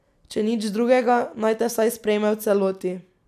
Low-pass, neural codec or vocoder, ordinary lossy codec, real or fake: 14.4 kHz; none; none; real